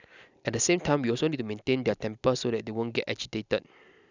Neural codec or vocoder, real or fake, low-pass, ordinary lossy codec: none; real; 7.2 kHz; none